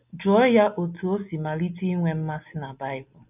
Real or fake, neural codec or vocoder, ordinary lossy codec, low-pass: real; none; none; 3.6 kHz